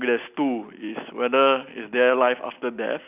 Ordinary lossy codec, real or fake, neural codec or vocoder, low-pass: none; real; none; 3.6 kHz